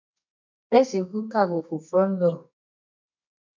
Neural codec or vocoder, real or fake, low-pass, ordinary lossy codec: codec, 24 kHz, 0.9 kbps, WavTokenizer, medium music audio release; fake; 7.2 kHz; MP3, 64 kbps